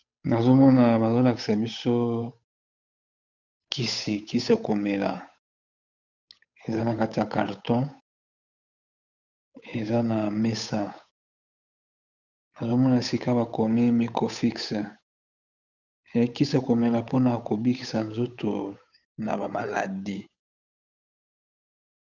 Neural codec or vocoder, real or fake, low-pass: codec, 16 kHz, 8 kbps, FunCodec, trained on Chinese and English, 25 frames a second; fake; 7.2 kHz